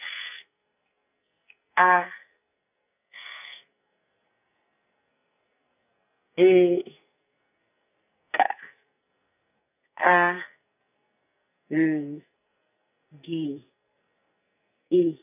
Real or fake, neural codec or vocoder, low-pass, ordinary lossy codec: fake; codec, 44.1 kHz, 2.6 kbps, SNAC; 3.6 kHz; none